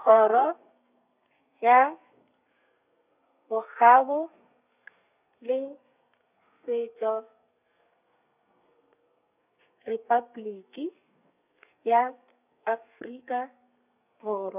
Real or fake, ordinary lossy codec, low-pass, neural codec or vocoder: fake; none; 3.6 kHz; codec, 32 kHz, 1.9 kbps, SNAC